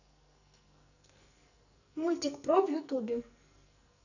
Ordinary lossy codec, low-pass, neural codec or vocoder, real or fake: none; 7.2 kHz; codec, 44.1 kHz, 2.6 kbps, SNAC; fake